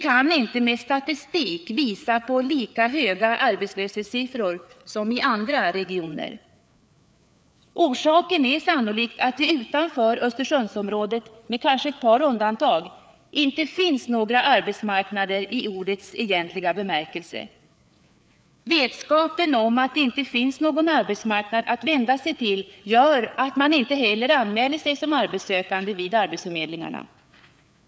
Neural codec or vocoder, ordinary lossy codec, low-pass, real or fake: codec, 16 kHz, 4 kbps, FreqCodec, larger model; none; none; fake